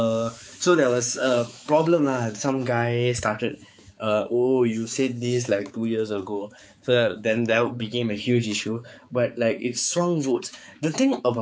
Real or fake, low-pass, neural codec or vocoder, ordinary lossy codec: fake; none; codec, 16 kHz, 4 kbps, X-Codec, HuBERT features, trained on balanced general audio; none